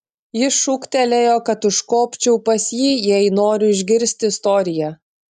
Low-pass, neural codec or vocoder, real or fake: 14.4 kHz; none; real